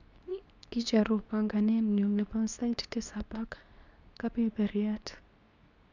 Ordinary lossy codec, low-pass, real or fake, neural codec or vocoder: none; 7.2 kHz; fake; codec, 24 kHz, 0.9 kbps, WavTokenizer, medium speech release version 2